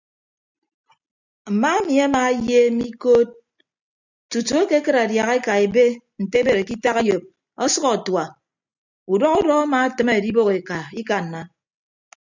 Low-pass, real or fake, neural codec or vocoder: 7.2 kHz; real; none